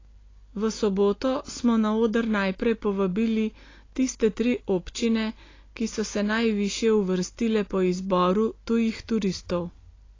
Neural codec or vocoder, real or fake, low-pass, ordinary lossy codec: none; real; 7.2 kHz; AAC, 32 kbps